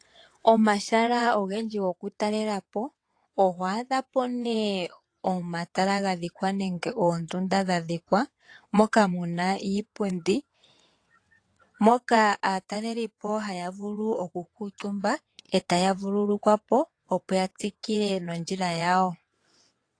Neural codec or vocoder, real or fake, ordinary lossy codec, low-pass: vocoder, 22.05 kHz, 80 mel bands, WaveNeXt; fake; AAC, 48 kbps; 9.9 kHz